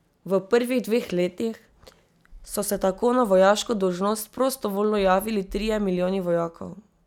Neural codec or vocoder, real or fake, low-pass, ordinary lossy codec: none; real; 19.8 kHz; none